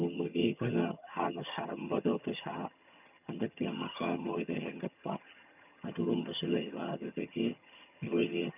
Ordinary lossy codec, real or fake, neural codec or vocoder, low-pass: none; fake; vocoder, 22.05 kHz, 80 mel bands, HiFi-GAN; 3.6 kHz